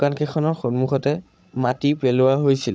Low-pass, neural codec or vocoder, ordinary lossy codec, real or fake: none; codec, 16 kHz, 16 kbps, FreqCodec, larger model; none; fake